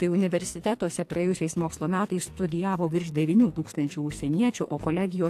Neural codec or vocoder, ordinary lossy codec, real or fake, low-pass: codec, 44.1 kHz, 2.6 kbps, SNAC; AAC, 64 kbps; fake; 14.4 kHz